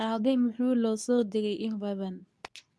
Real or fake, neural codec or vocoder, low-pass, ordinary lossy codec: fake; codec, 24 kHz, 0.9 kbps, WavTokenizer, medium speech release version 2; none; none